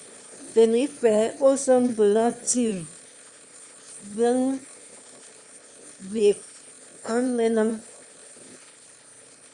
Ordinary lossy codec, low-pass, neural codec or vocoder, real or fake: Opus, 64 kbps; 9.9 kHz; autoencoder, 22.05 kHz, a latent of 192 numbers a frame, VITS, trained on one speaker; fake